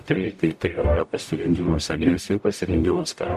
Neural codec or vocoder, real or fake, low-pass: codec, 44.1 kHz, 0.9 kbps, DAC; fake; 14.4 kHz